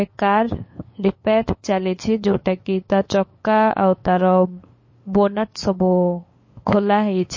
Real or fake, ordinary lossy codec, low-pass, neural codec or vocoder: fake; MP3, 32 kbps; 7.2 kHz; codec, 16 kHz, 8 kbps, FunCodec, trained on LibriTTS, 25 frames a second